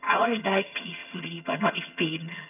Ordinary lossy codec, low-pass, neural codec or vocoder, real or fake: none; 3.6 kHz; vocoder, 22.05 kHz, 80 mel bands, HiFi-GAN; fake